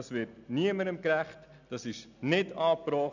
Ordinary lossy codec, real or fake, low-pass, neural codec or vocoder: none; real; 7.2 kHz; none